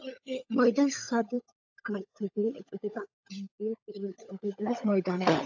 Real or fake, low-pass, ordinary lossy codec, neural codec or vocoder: fake; 7.2 kHz; AAC, 48 kbps; codec, 16 kHz, 16 kbps, FunCodec, trained on LibriTTS, 50 frames a second